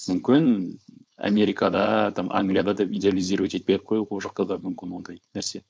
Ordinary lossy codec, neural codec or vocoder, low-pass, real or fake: none; codec, 16 kHz, 4.8 kbps, FACodec; none; fake